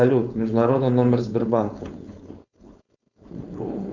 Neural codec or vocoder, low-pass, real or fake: codec, 16 kHz, 4.8 kbps, FACodec; 7.2 kHz; fake